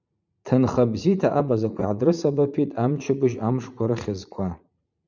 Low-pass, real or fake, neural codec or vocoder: 7.2 kHz; fake; vocoder, 44.1 kHz, 80 mel bands, Vocos